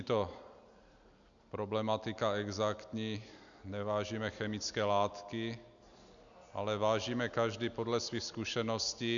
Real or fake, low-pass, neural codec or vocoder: real; 7.2 kHz; none